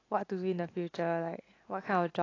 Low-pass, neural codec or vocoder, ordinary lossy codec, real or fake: 7.2 kHz; none; AAC, 32 kbps; real